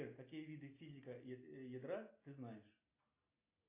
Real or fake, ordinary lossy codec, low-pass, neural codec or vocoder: real; AAC, 32 kbps; 3.6 kHz; none